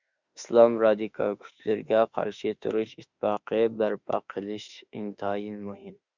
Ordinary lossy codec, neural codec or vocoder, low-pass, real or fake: Opus, 64 kbps; autoencoder, 48 kHz, 32 numbers a frame, DAC-VAE, trained on Japanese speech; 7.2 kHz; fake